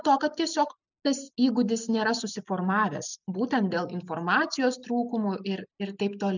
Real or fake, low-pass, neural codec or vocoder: real; 7.2 kHz; none